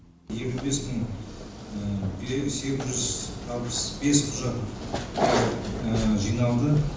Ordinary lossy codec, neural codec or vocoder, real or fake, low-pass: none; none; real; none